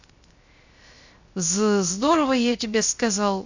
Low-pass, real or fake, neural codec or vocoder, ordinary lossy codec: 7.2 kHz; fake; codec, 16 kHz, 0.3 kbps, FocalCodec; Opus, 64 kbps